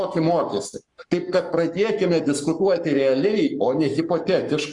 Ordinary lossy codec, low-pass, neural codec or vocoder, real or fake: Opus, 64 kbps; 10.8 kHz; codec, 44.1 kHz, 7.8 kbps, Pupu-Codec; fake